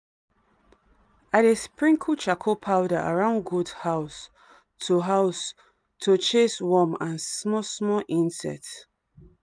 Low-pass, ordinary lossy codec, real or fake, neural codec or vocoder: none; none; real; none